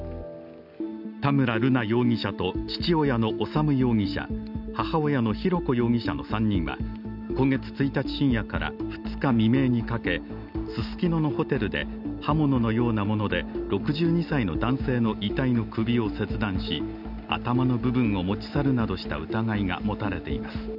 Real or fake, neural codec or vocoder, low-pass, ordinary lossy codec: real; none; 5.4 kHz; none